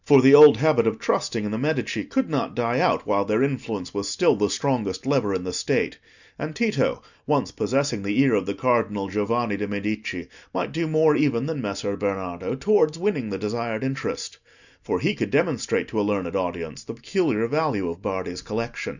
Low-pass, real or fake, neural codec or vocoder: 7.2 kHz; real; none